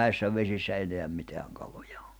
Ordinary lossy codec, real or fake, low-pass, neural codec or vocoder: none; real; none; none